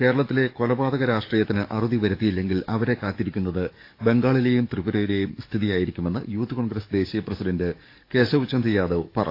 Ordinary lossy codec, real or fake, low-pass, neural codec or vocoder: AAC, 32 kbps; fake; 5.4 kHz; codec, 44.1 kHz, 7.8 kbps, DAC